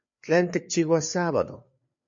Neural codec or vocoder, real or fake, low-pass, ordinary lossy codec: codec, 16 kHz, 4 kbps, FreqCodec, larger model; fake; 7.2 kHz; MP3, 48 kbps